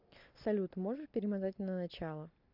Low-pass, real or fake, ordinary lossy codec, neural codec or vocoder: 5.4 kHz; real; MP3, 48 kbps; none